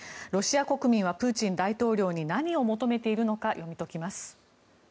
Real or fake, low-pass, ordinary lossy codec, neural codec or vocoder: real; none; none; none